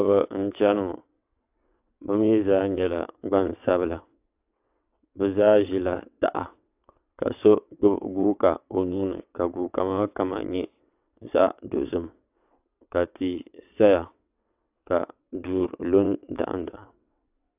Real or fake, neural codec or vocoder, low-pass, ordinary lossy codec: fake; vocoder, 22.05 kHz, 80 mel bands, WaveNeXt; 3.6 kHz; AAC, 32 kbps